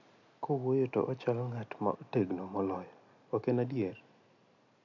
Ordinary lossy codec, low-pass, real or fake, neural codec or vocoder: none; 7.2 kHz; real; none